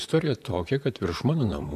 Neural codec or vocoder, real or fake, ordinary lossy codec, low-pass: vocoder, 44.1 kHz, 128 mel bands, Pupu-Vocoder; fake; AAC, 96 kbps; 14.4 kHz